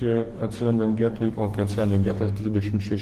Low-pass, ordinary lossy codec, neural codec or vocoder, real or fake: 14.4 kHz; Opus, 16 kbps; codec, 44.1 kHz, 2.6 kbps, DAC; fake